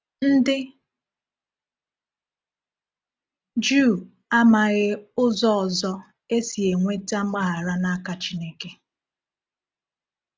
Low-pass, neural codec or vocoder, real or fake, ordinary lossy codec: none; none; real; none